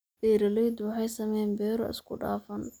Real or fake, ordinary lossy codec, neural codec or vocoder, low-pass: real; none; none; none